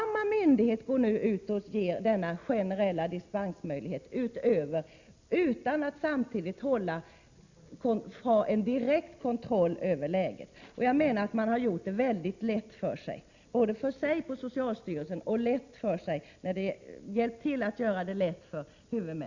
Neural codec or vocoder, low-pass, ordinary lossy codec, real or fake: none; 7.2 kHz; Opus, 64 kbps; real